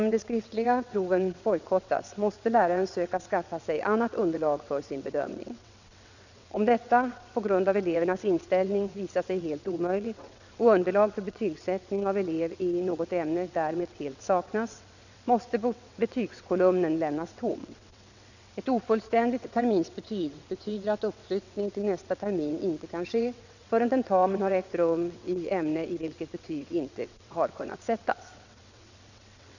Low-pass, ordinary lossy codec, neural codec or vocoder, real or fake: 7.2 kHz; none; vocoder, 22.05 kHz, 80 mel bands, WaveNeXt; fake